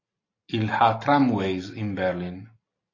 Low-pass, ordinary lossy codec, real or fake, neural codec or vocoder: 7.2 kHz; AAC, 32 kbps; real; none